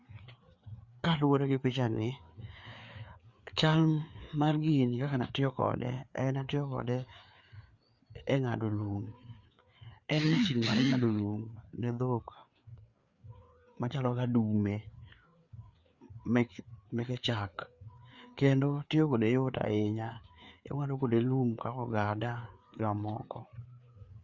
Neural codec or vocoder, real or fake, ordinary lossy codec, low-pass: codec, 16 kHz, 4 kbps, FreqCodec, larger model; fake; Opus, 64 kbps; 7.2 kHz